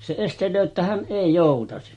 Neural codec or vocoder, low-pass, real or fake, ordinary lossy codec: none; 19.8 kHz; real; MP3, 48 kbps